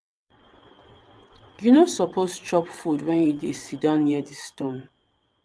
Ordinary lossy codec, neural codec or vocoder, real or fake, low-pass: none; vocoder, 22.05 kHz, 80 mel bands, WaveNeXt; fake; none